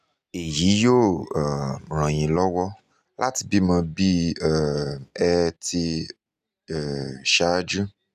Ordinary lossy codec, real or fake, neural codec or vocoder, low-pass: none; real; none; 14.4 kHz